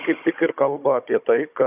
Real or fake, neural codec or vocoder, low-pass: fake; codec, 16 kHz, 16 kbps, FunCodec, trained on Chinese and English, 50 frames a second; 3.6 kHz